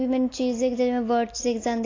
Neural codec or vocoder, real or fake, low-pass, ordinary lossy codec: none; real; 7.2 kHz; AAC, 32 kbps